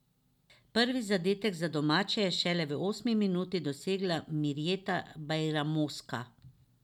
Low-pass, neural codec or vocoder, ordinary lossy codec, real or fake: 19.8 kHz; none; none; real